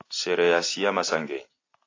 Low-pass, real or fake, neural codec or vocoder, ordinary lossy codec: 7.2 kHz; real; none; AAC, 32 kbps